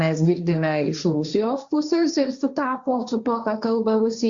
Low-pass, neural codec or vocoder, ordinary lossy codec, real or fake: 7.2 kHz; codec, 16 kHz, 1.1 kbps, Voila-Tokenizer; Opus, 64 kbps; fake